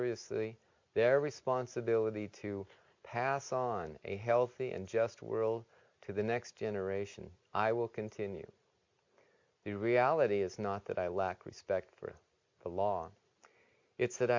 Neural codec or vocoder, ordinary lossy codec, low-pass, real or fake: none; MP3, 64 kbps; 7.2 kHz; real